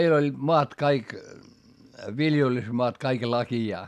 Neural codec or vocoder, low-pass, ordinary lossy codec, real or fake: none; 14.4 kHz; none; real